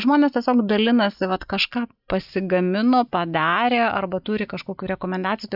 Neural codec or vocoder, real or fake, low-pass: none; real; 5.4 kHz